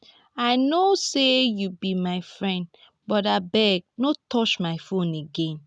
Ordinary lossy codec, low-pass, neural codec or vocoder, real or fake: none; none; none; real